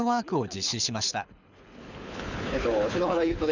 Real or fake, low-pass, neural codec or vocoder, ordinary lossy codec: fake; 7.2 kHz; codec, 24 kHz, 6 kbps, HILCodec; none